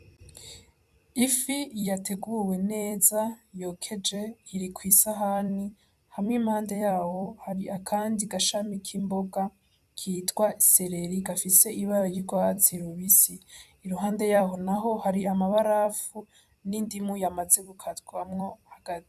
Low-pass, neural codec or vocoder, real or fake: 14.4 kHz; vocoder, 44.1 kHz, 128 mel bands every 256 samples, BigVGAN v2; fake